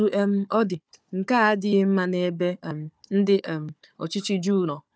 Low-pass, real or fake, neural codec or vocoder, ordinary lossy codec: none; fake; codec, 16 kHz, 4 kbps, FunCodec, trained on Chinese and English, 50 frames a second; none